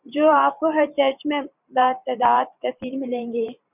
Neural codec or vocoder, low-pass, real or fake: vocoder, 44.1 kHz, 128 mel bands every 512 samples, BigVGAN v2; 3.6 kHz; fake